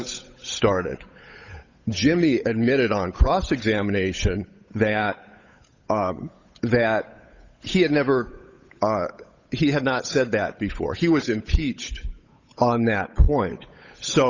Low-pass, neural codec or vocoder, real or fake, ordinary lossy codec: 7.2 kHz; codec, 16 kHz, 8 kbps, FunCodec, trained on Chinese and English, 25 frames a second; fake; Opus, 64 kbps